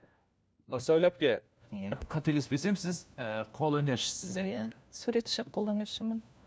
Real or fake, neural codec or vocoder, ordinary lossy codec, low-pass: fake; codec, 16 kHz, 1 kbps, FunCodec, trained on LibriTTS, 50 frames a second; none; none